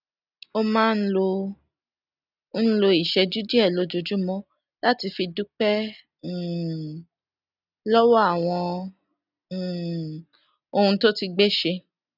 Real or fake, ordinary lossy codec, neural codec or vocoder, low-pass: real; none; none; 5.4 kHz